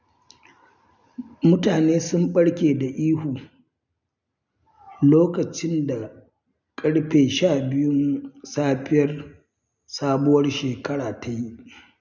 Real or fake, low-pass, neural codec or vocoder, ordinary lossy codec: real; 7.2 kHz; none; none